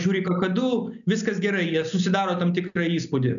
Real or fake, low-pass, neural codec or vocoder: real; 7.2 kHz; none